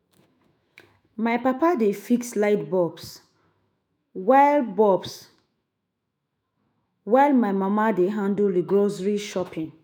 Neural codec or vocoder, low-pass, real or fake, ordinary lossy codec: autoencoder, 48 kHz, 128 numbers a frame, DAC-VAE, trained on Japanese speech; none; fake; none